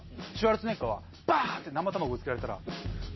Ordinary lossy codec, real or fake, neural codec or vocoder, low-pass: MP3, 24 kbps; real; none; 7.2 kHz